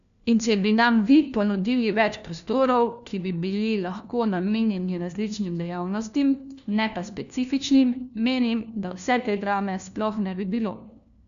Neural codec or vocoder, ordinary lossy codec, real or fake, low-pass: codec, 16 kHz, 1 kbps, FunCodec, trained on LibriTTS, 50 frames a second; none; fake; 7.2 kHz